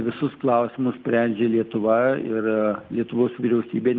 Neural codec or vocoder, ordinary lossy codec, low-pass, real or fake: none; Opus, 24 kbps; 7.2 kHz; real